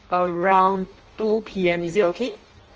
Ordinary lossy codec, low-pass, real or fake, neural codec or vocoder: Opus, 24 kbps; 7.2 kHz; fake; codec, 16 kHz in and 24 kHz out, 0.6 kbps, FireRedTTS-2 codec